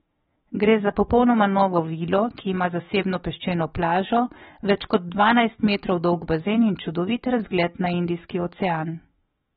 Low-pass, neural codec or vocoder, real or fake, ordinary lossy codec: 19.8 kHz; autoencoder, 48 kHz, 128 numbers a frame, DAC-VAE, trained on Japanese speech; fake; AAC, 16 kbps